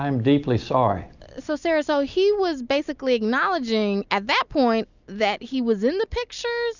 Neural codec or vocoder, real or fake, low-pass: none; real; 7.2 kHz